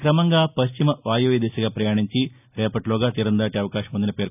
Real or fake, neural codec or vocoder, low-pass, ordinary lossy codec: real; none; 3.6 kHz; none